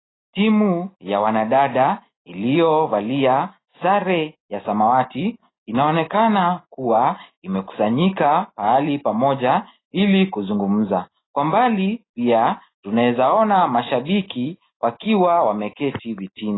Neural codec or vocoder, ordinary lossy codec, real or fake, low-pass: none; AAC, 16 kbps; real; 7.2 kHz